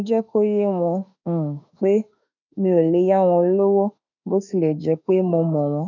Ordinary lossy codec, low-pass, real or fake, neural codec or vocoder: none; 7.2 kHz; fake; autoencoder, 48 kHz, 32 numbers a frame, DAC-VAE, trained on Japanese speech